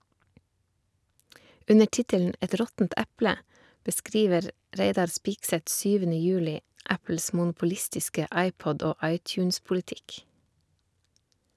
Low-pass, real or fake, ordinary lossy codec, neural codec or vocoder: none; real; none; none